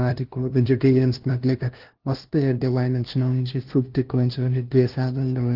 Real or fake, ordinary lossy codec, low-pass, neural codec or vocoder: fake; Opus, 16 kbps; 5.4 kHz; codec, 16 kHz, 0.5 kbps, FunCodec, trained on LibriTTS, 25 frames a second